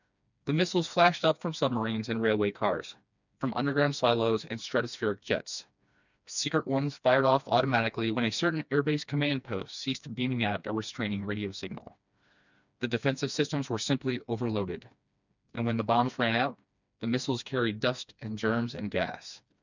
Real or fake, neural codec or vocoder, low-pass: fake; codec, 16 kHz, 2 kbps, FreqCodec, smaller model; 7.2 kHz